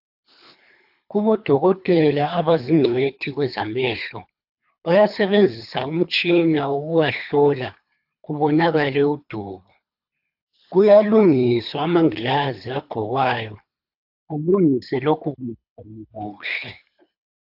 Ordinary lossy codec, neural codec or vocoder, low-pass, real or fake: AAC, 48 kbps; codec, 24 kHz, 3 kbps, HILCodec; 5.4 kHz; fake